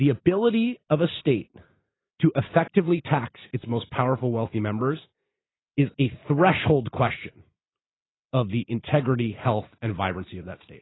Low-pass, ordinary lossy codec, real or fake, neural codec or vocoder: 7.2 kHz; AAC, 16 kbps; real; none